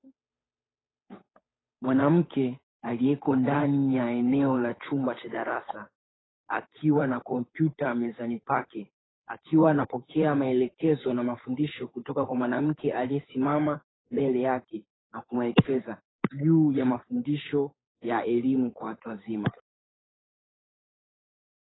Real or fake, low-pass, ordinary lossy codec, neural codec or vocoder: fake; 7.2 kHz; AAC, 16 kbps; codec, 16 kHz, 8 kbps, FunCodec, trained on Chinese and English, 25 frames a second